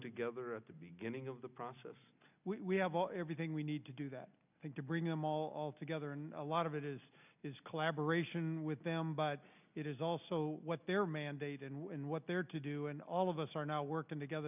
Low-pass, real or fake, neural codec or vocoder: 3.6 kHz; real; none